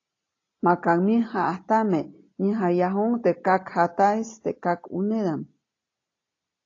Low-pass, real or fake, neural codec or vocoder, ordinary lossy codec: 7.2 kHz; real; none; AAC, 32 kbps